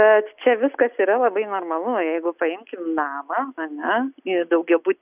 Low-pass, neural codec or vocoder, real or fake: 3.6 kHz; none; real